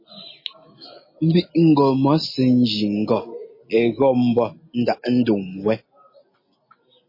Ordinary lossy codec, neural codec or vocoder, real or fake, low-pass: MP3, 24 kbps; none; real; 5.4 kHz